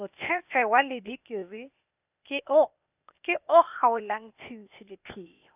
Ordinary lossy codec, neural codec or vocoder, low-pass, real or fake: none; codec, 16 kHz, 0.8 kbps, ZipCodec; 3.6 kHz; fake